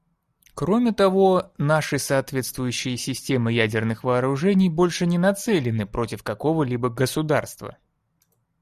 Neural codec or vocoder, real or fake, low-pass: none; real; 14.4 kHz